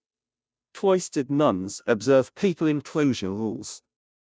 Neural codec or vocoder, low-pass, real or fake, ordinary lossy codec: codec, 16 kHz, 0.5 kbps, FunCodec, trained on Chinese and English, 25 frames a second; none; fake; none